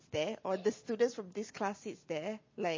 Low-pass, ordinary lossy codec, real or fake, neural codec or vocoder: 7.2 kHz; MP3, 32 kbps; real; none